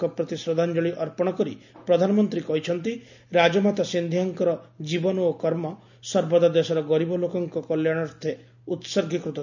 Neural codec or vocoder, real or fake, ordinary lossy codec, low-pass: none; real; none; 7.2 kHz